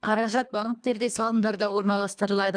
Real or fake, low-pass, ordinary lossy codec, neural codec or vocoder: fake; 9.9 kHz; none; codec, 24 kHz, 1.5 kbps, HILCodec